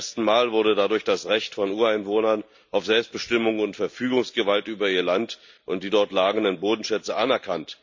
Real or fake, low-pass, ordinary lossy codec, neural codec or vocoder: real; 7.2 kHz; MP3, 64 kbps; none